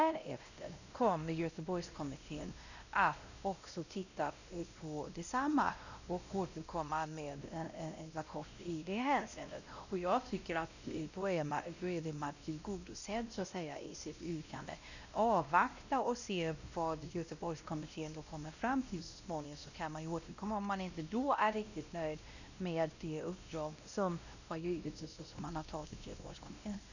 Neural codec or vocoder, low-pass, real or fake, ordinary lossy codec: codec, 16 kHz, 1 kbps, X-Codec, WavLM features, trained on Multilingual LibriSpeech; 7.2 kHz; fake; none